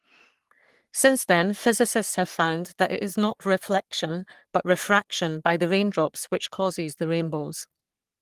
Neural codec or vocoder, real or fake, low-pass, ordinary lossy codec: codec, 44.1 kHz, 3.4 kbps, Pupu-Codec; fake; 14.4 kHz; Opus, 24 kbps